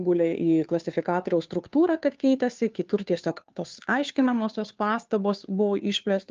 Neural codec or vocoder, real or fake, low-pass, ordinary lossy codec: codec, 16 kHz, 2 kbps, FunCodec, trained on Chinese and English, 25 frames a second; fake; 7.2 kHz; Opus, 24 kbps